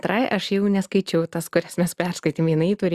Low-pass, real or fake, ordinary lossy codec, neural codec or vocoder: 14.4 kHz; real; Opus, 64 kbps; none